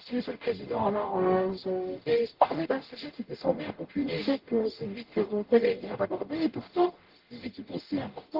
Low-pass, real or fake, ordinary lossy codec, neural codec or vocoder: 5.4 kHz; fake; Opus, 16 kbps; codec, 44.1 kHz, 0.9 kbps, DAC